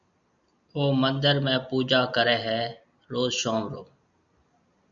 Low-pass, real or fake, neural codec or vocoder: 7.2 kHz; real; none